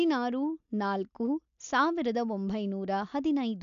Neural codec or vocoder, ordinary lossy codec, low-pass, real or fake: none; none; 7.2 kHz; real